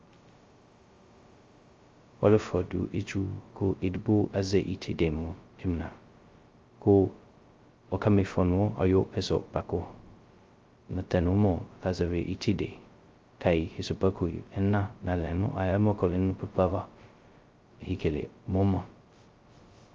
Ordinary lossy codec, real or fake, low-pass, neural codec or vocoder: Opus, 32 kbps; fake; 7.2 kHz; codec, 16 kHz, 0.2 kbps, FocalCodec